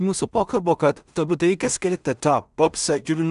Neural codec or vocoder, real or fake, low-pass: codec, 16 kHz in and 24 kHz out, 0.4 kbps, LongCat-Audio-Codec, two codebook decoder; fake; 10.8 kHz